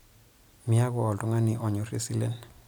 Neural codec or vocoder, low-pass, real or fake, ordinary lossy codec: none; none; real; none